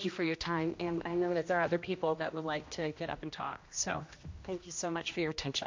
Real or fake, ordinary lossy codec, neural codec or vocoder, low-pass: fake; MP3, 48 kbps; codec, 16 kHz, 1 kbps, X-Codec, HuBERT features, trained on general audio; 7.2 kHz